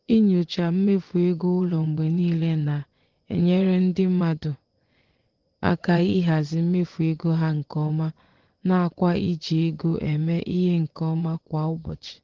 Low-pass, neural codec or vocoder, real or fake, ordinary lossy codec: 7.2 kHz; vocoder, 24 kHz, 100 mel bands, Vocos; fake; Opus, 16 kbps